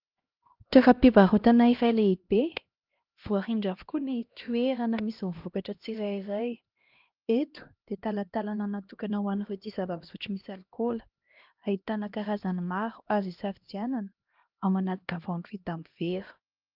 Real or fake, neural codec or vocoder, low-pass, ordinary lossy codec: fake; codec, 16 kHz, 1 kbps, X-Codec, HuBERT features, trained on LibriSpeech; 5.4 kHz; Opus, 24 kbps